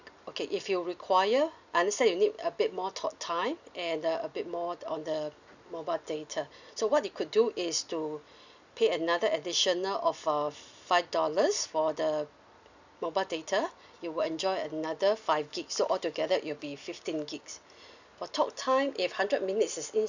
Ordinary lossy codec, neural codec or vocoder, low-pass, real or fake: none; none; 7.2 kHz; real